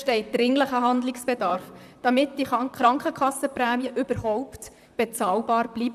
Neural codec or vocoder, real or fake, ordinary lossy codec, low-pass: vocoder, 44.1 kHz, 128 mel bands, Pupu-Vocoder; fake; none; 14.4 kHz